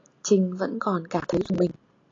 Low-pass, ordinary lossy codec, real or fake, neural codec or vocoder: 7.2 kHz; AAC, 32 kbps; real; none